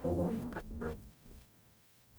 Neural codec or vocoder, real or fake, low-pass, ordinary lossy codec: codec, 44.1 kHz, 0.9 kbps, DAC; fake; none; none